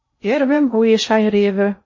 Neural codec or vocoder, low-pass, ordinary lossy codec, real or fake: codec, 16 kHz in and 24 kHz out, 0.6 kbps, FocalCodec, streaming, 2048 codes; 7.2 kHz; MP3, 32 kbps; fake